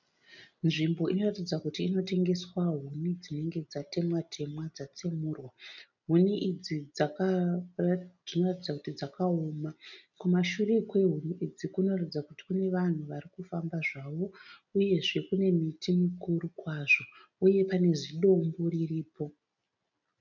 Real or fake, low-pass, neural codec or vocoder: real; 7.2 kHz; none